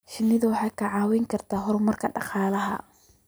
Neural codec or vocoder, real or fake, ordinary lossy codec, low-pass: none; real; none; none